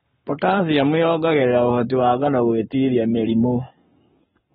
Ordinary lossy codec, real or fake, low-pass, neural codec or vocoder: AAC, 16 kbps; fake; 19.8 kHz; codec, 44.1 kHz, 7.8 kbps, Pupu-Codec